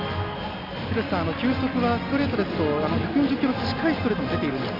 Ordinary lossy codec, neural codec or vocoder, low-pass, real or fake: none; none; 5.4 kHz; real